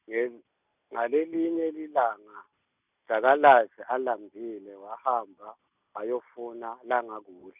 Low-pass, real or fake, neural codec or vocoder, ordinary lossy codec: 3.6 kHz; real; none; none